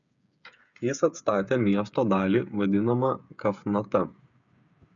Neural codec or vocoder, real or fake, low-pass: codec, 16 kHz, 8 kbps, FreqCodec, smaller model; fake; 7.2 kHz